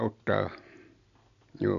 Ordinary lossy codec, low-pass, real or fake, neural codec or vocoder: none; 7.2 kHz; real; none